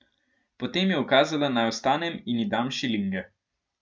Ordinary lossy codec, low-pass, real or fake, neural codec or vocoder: none; none; real; none